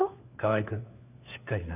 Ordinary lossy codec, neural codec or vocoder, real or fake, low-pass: none; codec, 16 kHz, 4 kbps, FunCodec, trained on LibriTTS, 50 frames a second; fake; 3.6 kHz